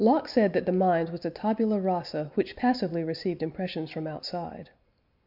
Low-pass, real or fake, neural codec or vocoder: 5.4 kHz; real; none